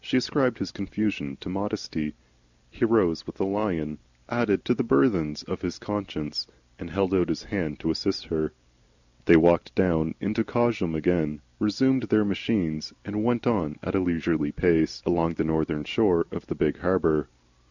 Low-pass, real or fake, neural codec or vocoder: 7.2 kHz; real; none